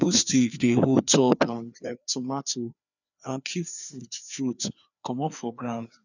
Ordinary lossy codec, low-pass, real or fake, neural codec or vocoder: none; 7.2 kHz; fake; codec, 16 kHz, 2 kbps, FreqCodec, larger model